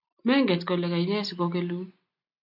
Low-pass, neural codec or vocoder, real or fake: 5.4 kHz; none; real